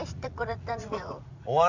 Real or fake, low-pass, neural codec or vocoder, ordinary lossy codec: fake; 7.2 kHz; vocoder, 44.1 kHz, 80 mel bands, Vocos; none